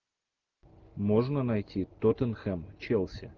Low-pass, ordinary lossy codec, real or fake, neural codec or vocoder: 7.2 kHz; Opus, 24 kbps; real; none